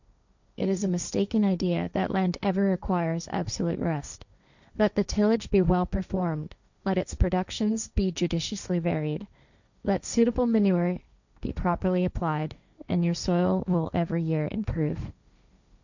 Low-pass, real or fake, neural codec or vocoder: 7.2 kHz; fake; codec, 16 kHz, 1.1 kbps, Voila-Tokenizer